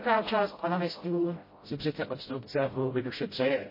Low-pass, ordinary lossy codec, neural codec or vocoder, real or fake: 5.4 kHz; MP3, 24 kbps; codec, 16 kHz, 0.5 kbps, FreqCodec, smaller model; fake